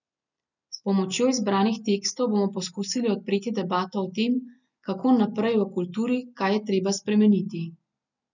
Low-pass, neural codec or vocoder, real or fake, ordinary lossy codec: 7.2 kHz; none; real; none